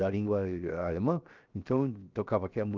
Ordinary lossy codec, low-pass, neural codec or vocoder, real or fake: Opus, 24 kbps; 7.2 kHz; codec, 16 kHz, 0.7 kbps, FocalCodec; fake